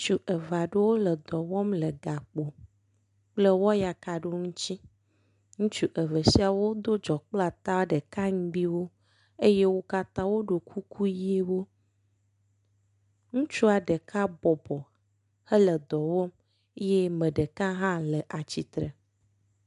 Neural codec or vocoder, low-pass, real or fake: none; 10.8 kHz; real